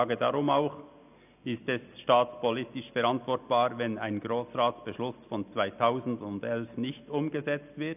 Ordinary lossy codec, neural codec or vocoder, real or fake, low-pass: none; none; real; 3.6 kHz